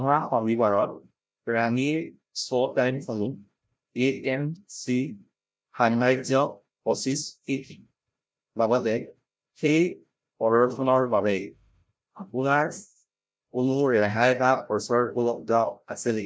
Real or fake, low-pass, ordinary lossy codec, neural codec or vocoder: fake; none; none; codec, 16 kHz, 0.5 kbps, FreqCodec, larger model